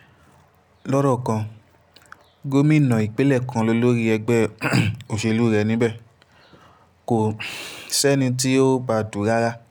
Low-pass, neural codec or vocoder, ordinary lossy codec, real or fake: none; none; none; real